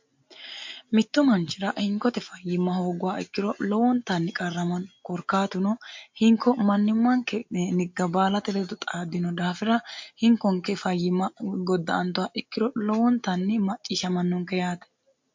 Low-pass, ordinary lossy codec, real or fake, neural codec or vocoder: 7.2 kHz; MP3, 48 kbps; real; none